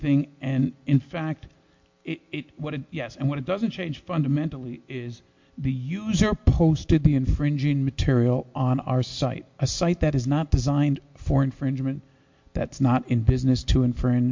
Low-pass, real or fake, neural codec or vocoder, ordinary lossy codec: 7.2 kHz; real; none; MP3, 48 kbps